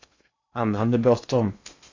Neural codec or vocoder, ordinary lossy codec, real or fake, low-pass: codec, 16 kHz in and 24 kHz out, 0.8 kbps, FocalCodec, streaming, 65536 codes; AAC, 48 kbps; fake; 7.2 kHz